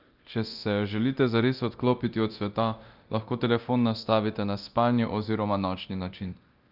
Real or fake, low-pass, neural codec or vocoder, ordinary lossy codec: fake; 5.4 kHz; codec, 24 kHz, 0.9 kbps, DualCodec; Opus, 24 kbps